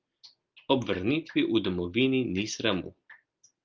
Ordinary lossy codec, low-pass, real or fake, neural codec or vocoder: Opus, 24 kbps; 7.2 kHz; real; none